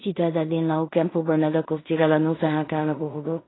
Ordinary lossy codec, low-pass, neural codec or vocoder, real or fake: AAC, 16 kbps; 7.2 kHz; codec, 16 kHz in and 24 kHz out, 0.4 kbps, LongCat-Audio-Codec, two codebook decoder; fake